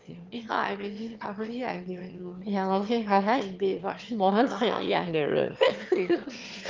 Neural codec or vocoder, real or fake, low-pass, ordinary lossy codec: autoencoder, 22.05 kHz, a latent of 192 numbers a frame, VITS, trained on one speaker; fake; 7.2 kHz; Opus, 32 kbps